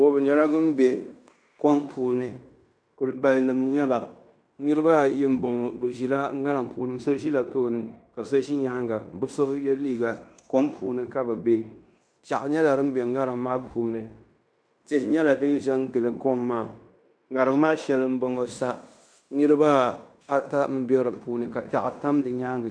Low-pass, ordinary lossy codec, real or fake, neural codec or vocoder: 9.9 kHz; MP3, 96 kbps; fake; codec, 16 kHz in and 24 kHz out, 0.9 kbps, LongCat-Audio-Codec, fine tuned four codebook decoder